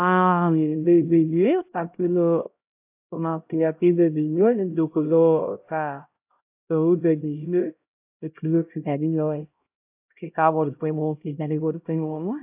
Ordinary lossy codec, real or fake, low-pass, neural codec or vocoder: AAC, 32 kbps; fake; 3.6 kHz; codec, 16 kHz, 0.5 kbps, X-Codec, HuBERT features, trained on LibriSpeech